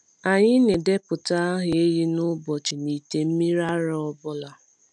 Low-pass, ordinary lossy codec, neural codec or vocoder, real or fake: 10.8 kHz; none; none; real